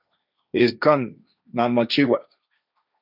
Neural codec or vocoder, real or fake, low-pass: codec, 16 kHz, 1.1 kbps, Voila-Tokenizer; fake; 5.4 kHz